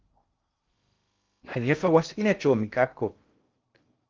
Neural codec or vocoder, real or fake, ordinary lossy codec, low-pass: codec, 16 kHz in and 24 kHz out, 0.6 kbps, FocalCodec, streaming, 2048 codes; fake; Opus, 32 kbps; 7.2 kHz